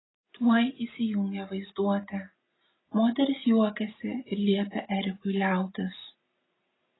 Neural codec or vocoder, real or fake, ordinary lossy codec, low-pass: none; real; AAC, 16 kbps; 7.2 kHz